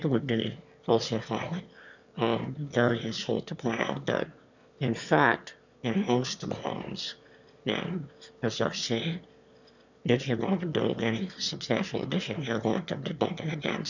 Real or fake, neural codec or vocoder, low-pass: fake; autoencoder, 22.05 kHz, a latent of 192 numbers a frame, VITS, trained on one speaker; 7.2 kHz